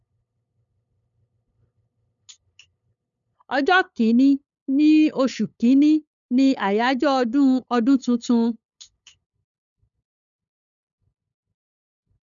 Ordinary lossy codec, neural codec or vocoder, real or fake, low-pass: none; codec, 16 kHz, 8 kbps, FunCodec, trained on LibriTTS, 25 frames a second; fake; 7.2 kHz